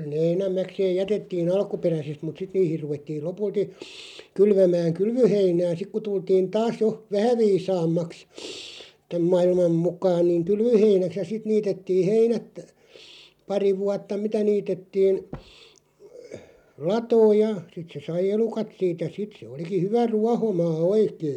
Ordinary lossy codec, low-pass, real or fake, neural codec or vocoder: none; 19.8 kHz; real; none